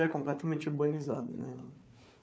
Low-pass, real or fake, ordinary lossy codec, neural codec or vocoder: none; fake; none; codec, 16 kHz, 4 kbps, FunCodec, trained on Chinese and English, 50 frames a second